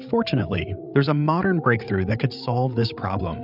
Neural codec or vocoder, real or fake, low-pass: codec, 16 kHz, 8 kbps, FreqCodec, larger model; fake; 5.4 kHz